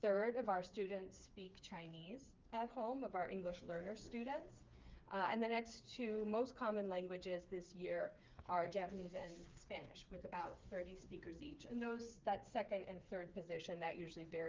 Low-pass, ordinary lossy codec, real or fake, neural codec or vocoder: 7.2 kHz; Opus, 32 kbps; fake; codec, 16 kHz, 4 kbps, FreqCodec, smaller model